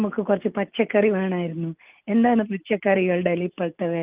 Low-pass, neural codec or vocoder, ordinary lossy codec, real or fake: 3.6 kHz; none; Opus, 32 kbps; real